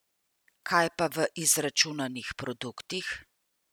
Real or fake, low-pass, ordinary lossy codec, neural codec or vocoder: real; none; none; none